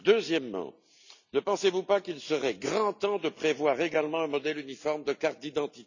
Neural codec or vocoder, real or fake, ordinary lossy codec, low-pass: none; real; none; 7.2 kHz